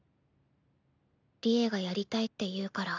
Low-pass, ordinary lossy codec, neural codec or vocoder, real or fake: 7.2 kHz; none; none; real